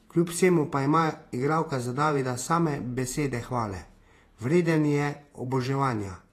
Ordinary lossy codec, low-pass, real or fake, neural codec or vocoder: AAC, 48 kbps; 14.4 kHz; fake; vocoder, 48 kHz, 128 mel bands, Vocos